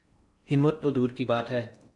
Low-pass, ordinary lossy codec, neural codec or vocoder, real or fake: 10.8 kHz; MP3, 96 kbps; codec, 16 kHz in and 24 kHz out, 0.6 kbps, FocalCodec, streaming, 4096 codes; fake